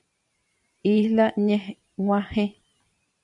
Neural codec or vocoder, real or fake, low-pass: vocoder, 44.1 kHz, 128 mel bands every 512 samples, BigVGAN v2; fake; 10.8 kHz